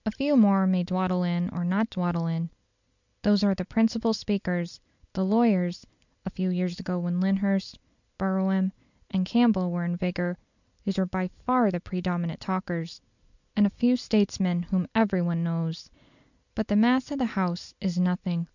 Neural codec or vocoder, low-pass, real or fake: none; 7.2 kHz; real